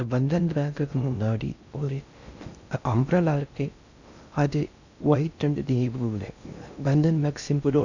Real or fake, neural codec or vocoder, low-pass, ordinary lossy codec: fake; codec, 16 kHz in and 24 kHz out, 0.6 kbps, FocalCodec, streaming, 2048 codes; 7.2 kHz; none